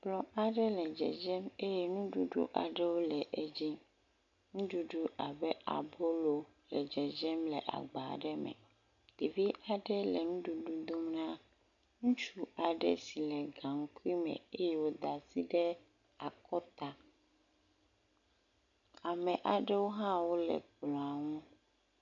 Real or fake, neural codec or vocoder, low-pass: real; none; 7.2 kHz